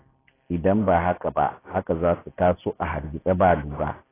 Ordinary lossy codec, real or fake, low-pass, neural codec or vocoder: AAC, 16 kbps; real; 3.6 kHz; none